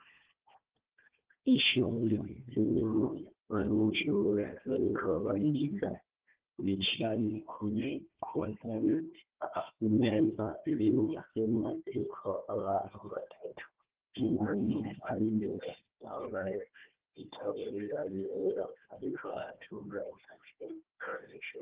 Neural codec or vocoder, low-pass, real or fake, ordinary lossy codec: codec, 16 kHz, 1 kbps, FunCodec, trained on Chinese and English, 50 frames a second; 3.6 kHz; fake; Opus, 16 kbps